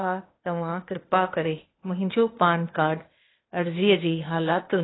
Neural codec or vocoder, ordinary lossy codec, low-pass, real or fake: codec, 16 kHz, 0.8 kbps, ZipCodec; AAC, 16 kbps; 7.2 kHz; fake